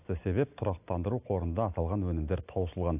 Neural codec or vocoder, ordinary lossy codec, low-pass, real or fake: none; AAC, 32 kbps; 3.6 kHz; real